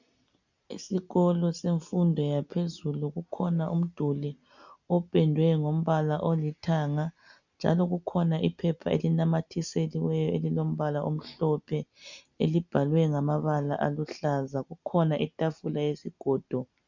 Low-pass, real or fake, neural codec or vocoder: 7.2 kHz; real; none